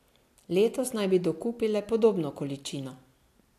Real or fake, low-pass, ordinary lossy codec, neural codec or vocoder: real; 14.4 kHz; AAC, 64 kbps; none